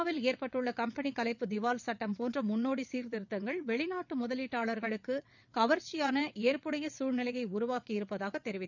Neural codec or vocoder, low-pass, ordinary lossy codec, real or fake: vocoder, 22.05 kHz, 80 mel bands, WaveNeXt; 7.2 kHz; none; fake